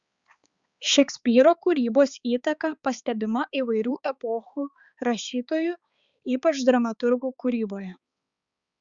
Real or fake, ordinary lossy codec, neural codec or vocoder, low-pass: fake; Opus, 64 kbps; codec, 16 kHz, 4 kbps, X-Codec, HuBERT features, trained on balanced general audio; 7.2 kHz